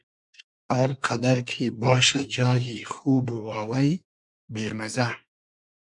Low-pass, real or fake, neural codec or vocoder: 10.8 kHz; fake; codec, 24 kHz, 1 kbps, SNAC